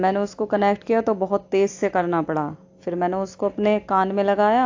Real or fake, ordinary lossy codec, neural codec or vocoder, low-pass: real; AAC, 48 kbps; none; 7.2 kHz